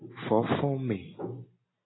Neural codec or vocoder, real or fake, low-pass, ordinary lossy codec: none; real; 7.2 kHz; AAC, 16 kbps